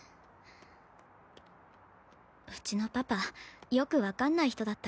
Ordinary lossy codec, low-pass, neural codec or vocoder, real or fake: none; none; none; real